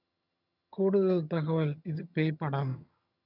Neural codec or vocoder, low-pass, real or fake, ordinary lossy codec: vocoder, 22.05 kHz, 80 mel bands, HiFi-GAN; 5.4 kHz; fake; none